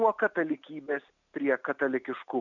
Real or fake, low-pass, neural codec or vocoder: real; 7.2 kHz; none